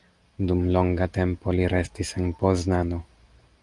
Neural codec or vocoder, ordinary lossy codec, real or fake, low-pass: none; Opus, 32 kbps; real; 10.8 kHz